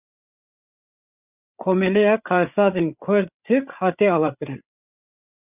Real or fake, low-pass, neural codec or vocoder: fake; 3.6 kHz; codec, 16 kHz, 8 kbps, FreqCodec, larger model